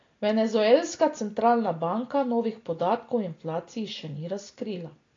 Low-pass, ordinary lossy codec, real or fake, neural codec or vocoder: 7.2 kHz; AAC, 32 kbps; real; none